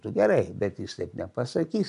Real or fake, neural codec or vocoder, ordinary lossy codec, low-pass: real; none; AAC, 96 kbps; 10.8 kHz